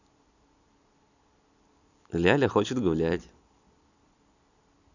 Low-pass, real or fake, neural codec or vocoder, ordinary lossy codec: 7.2 kHz; real; none; none